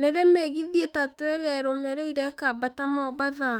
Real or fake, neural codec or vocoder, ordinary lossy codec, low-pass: fake; autoencoder, 48 kHz, 32 numbers a frame, DAC-VAE, trained on Japanese speech; none; 19.8 kHz